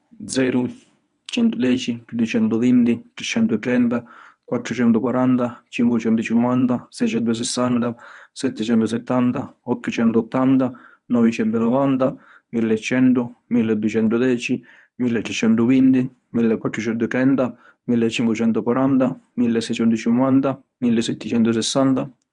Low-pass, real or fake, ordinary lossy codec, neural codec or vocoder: 10.8 kHz; fake; none; codec, 24 kHz, 0.9 kbps, WavTokenizer, medium speech release version 1